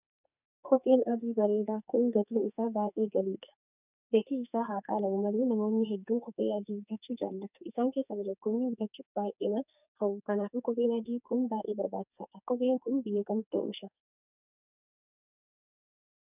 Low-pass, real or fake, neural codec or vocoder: 3.6 kHz; fake; codec, 44.1 kHz, 2.6 kbps, SNAC